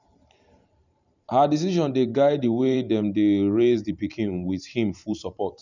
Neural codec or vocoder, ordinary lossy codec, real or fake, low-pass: vocoder, 44.1 kHz, 128 mel bands every 512 samples, BigVGAN v2; none; fake; 7.2 kHz